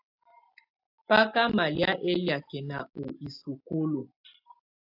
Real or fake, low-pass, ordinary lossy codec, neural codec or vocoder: real; 5.4 kHz; AAC, 48 kbps; none